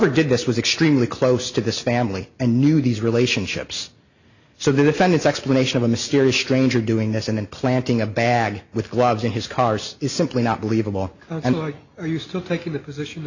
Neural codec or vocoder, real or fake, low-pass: none; real; 7.2 kHz